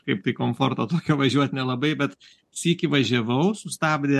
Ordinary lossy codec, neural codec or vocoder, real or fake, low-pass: MP3, 64 kbps; none; real; 14.4 kHz